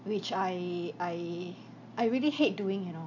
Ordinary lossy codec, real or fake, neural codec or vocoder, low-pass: none; real; none; 7.2 kHz